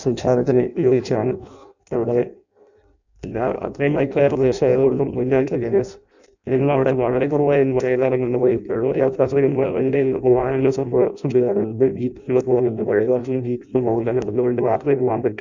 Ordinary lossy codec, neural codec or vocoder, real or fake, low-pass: none; codec, 16 kHz in and 24 kHz out, 0.6 kbps, FireRedTTS-2 codec; fake; 7.2 kHz